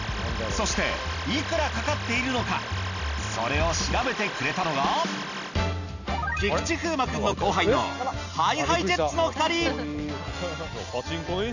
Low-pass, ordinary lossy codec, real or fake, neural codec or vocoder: 7.2 kHz; none; real; none